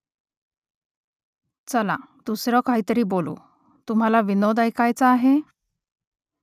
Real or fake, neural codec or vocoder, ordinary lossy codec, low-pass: real; none; none; 14.4 kHz